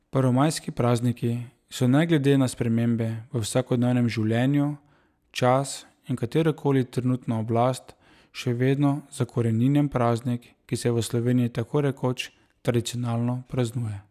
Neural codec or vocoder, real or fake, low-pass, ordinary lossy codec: none; real; 14.4 kHz; none